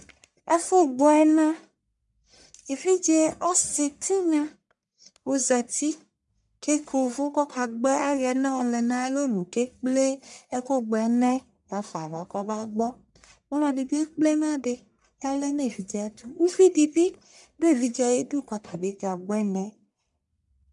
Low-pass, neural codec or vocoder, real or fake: 10.8 kHz; codec, 44.1 kHz, 1.7 kbps, Pupu-Codec; fake